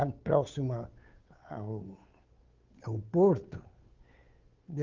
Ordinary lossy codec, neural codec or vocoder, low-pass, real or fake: Opus, 24 kbps; codec, 16 kHz, 8 kbps, FunCodec, trained on Chinese and English, 25 frames a second; 7.2 kHz; fake